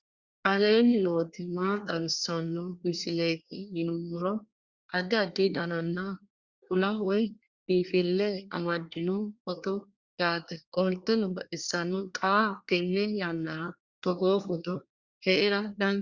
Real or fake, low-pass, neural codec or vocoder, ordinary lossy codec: fake; 7.2 kHz; codec, 24 kHz, 1 kbps, SNAC; Opus, 64 kbps